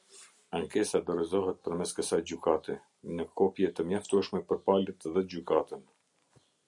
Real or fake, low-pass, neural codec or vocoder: real; 10.8 kHz; none